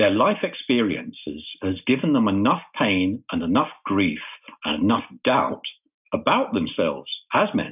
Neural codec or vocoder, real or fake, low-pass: none; real; 3.6 kHz